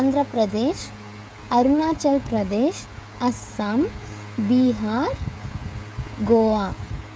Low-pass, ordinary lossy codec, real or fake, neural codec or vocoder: none; none; fake; codec, 16 kHz, 16 kbps, FreqCodec, smaller model